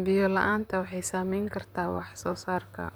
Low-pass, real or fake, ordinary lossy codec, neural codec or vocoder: none; fake; none; vocoder, 44.1 kHz, 128 mel bands, Pupu-Vocoder